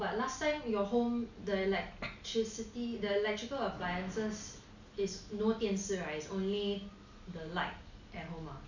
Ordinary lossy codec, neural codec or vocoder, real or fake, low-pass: none; none; real; 7.2 kHz